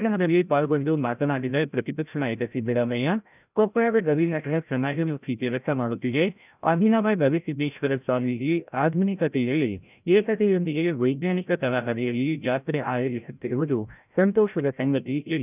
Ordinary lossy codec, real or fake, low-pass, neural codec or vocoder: none; fake; 3.6 kHz; codec, 16 kHz, 0.5 kbps, FreqCodec, larger model